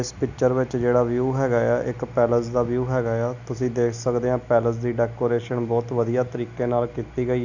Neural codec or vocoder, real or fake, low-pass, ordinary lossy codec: none; real; 7.2 kHz; none